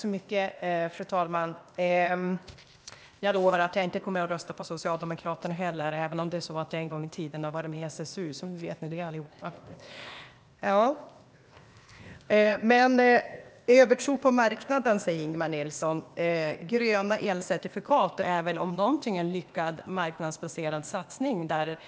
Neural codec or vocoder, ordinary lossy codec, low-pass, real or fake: codec, 16 kHz, 0.8 kbps, ZipCodec; none; none; fake